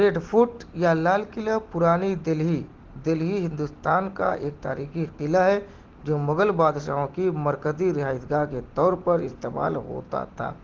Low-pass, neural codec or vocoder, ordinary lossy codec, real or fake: 7.2 kHz; none; Opus, 16 kbps; real